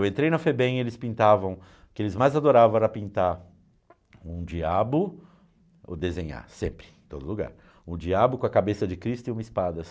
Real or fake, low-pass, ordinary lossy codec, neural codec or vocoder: real; none; none; none